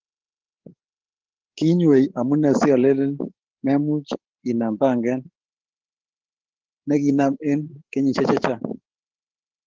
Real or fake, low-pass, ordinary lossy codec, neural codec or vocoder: fake; 7.2 kHz; Opus, 16 kbps; codec, 16 kHz, 16 kbps, FreqCodec, larger model